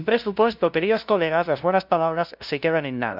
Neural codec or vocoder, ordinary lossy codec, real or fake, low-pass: codec, 16 kHz, 0.5 kbps, FunCodec, trained on LibriTTS, 25 frames a second; none; fake; 5.4 kHz